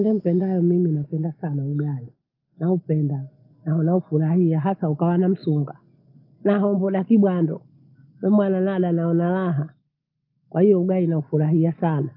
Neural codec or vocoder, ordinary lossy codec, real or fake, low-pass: none; Opus, 24 kbps; real; 5.4 kHz